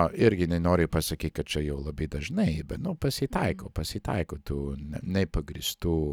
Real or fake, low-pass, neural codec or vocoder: real; 19.8 kHz; none